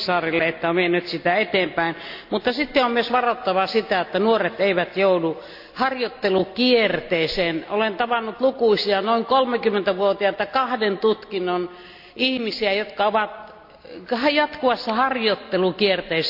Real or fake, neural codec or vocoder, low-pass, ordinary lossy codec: real; none; 5.4 kHz; AAC, 48 kbps